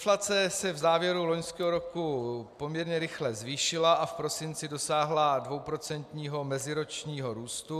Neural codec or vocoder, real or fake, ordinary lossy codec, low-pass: none; real; AAC, 96 kbps; 14.4 kHz